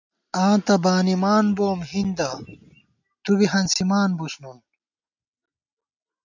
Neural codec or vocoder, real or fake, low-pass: none; real; 7.2 kHz